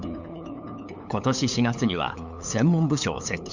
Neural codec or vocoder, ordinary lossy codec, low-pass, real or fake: codec, 16 kHz, 8 kbps, FunCodec, trained on LibriTTS, 25 frames a second; none; 7.2 kHz; fake